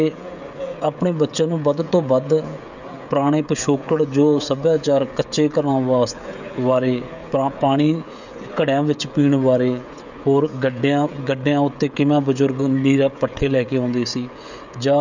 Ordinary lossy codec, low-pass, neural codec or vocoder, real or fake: none; 7.2 kHz; codec, 16 kHz, 16 kbps, FreqCodec, smaller model; fake